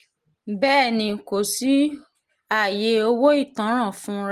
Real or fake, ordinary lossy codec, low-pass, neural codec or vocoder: real; Opus, 24 kbps; 14.4 kHz; none